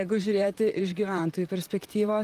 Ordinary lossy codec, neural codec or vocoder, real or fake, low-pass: Opus, 32 kbps; vocoder, 44.1 kHz, 128 mel bands, Pupu-Vocoder; fake; 14.4 kHz